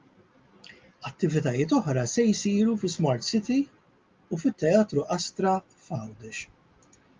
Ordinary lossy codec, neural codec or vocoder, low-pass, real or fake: Opus, 32 kbps; none; 7.2 kHz; real